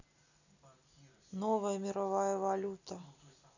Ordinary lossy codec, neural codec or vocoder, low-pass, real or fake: none; none; 7.2 kHz; real